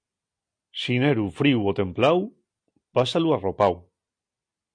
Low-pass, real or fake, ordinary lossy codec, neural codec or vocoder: 9.9 kHz; real; MP3, 64 kbps; none